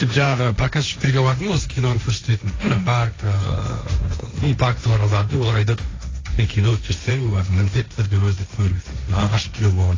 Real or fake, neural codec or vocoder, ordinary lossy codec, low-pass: fake; codec, 16 kHz, 1.1 kbps, Voila-Tokenizer; AAC, 32 kbps; 7.2 kHz